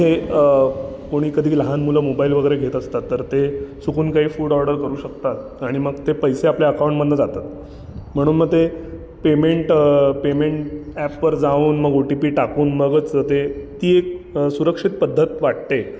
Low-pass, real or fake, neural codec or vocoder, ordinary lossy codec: none; real; none; none